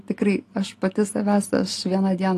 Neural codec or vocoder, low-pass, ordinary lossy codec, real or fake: none; 14.4 kHz; AAC, 48 kbps; real